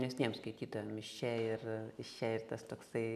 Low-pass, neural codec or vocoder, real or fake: 14.4 kHz; none; real